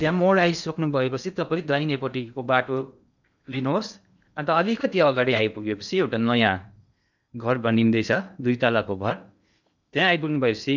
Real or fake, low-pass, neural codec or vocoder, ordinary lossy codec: fake; 7.2 kHz; codec, 16 kHz in and 24 kHz out, 0.8 kbps, FocalCodec, streaming, 65536 codes; none